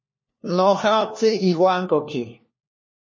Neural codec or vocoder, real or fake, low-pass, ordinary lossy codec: codec, 16 kHz, 1 kbps, FunCodec, trained on LibriTTS, 50 frames a second; fake; 7.2 kHz; MP3, 32 kbps